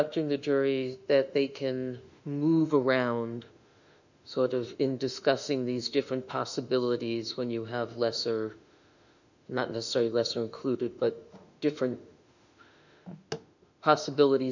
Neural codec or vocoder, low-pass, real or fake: autoencoder, 48 kHz, 32 numbers a frame, DAC-VAE, trained on Japanese speech; 7.2 kHz; fake